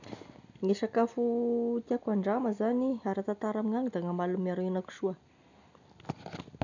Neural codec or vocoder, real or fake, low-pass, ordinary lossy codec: none; real; 7.2 kHz; none